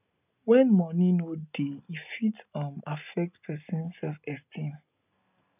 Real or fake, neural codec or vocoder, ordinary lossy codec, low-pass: real; none; none; 3.6 kHz